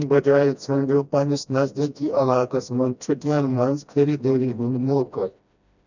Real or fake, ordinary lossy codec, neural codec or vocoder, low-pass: fake; none; codec, 16 kHz, 1 kbps, FreqCodec, smaller model; 7.2 kHz